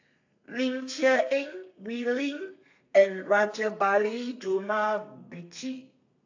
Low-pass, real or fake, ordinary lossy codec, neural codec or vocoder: 7.2 kHz; fake; AAC, 48 kbps; codec, 32 kHz, 1.9 kbps, SNAC